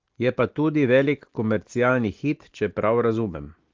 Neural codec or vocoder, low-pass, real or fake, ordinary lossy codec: none; 7.2 kHz; real; Opus, 16 kbps